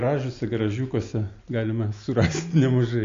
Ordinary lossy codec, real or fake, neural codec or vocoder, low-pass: AAC, 48 kbps; real; none; 7.2 kHz